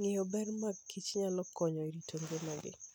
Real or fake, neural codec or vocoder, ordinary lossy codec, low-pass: real; none; none; none